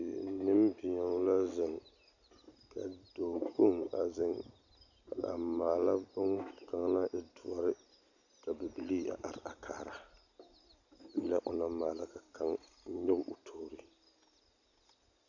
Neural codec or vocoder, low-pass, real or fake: none; 7.2 kHz; real